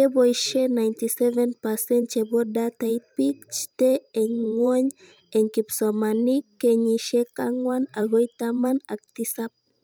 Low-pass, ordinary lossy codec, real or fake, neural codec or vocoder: none; none; fake; vocoder, 44.1 kHz, 128 mel bands every 512 samples, BigVGAN v2